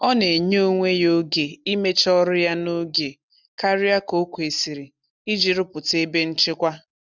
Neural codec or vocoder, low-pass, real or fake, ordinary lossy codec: none; 7.2 kHz; real; none